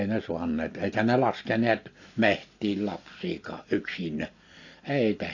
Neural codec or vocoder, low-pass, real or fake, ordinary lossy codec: none; 7.2 kHz; real; AAC, 48 kbps